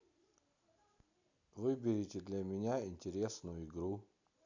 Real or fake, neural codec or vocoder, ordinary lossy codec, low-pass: real; none; none; 7.2 kHz